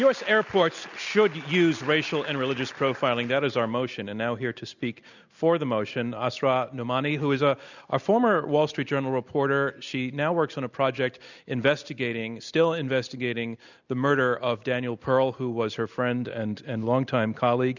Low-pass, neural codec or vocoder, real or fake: 7.2 kHz; none; real